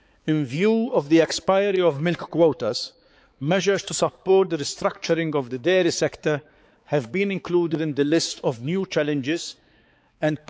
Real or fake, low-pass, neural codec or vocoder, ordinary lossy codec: fake; none; codec, 16 kHz, 4 kbps, X-Codec, HuBERT features, trained on balanced general audio; none